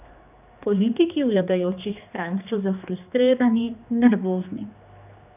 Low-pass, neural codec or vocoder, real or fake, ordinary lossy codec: 3.6 kHz; codec, 16 kHz, 2 kbps, X-Codec, HuBERT features, trained on general audio; fake; none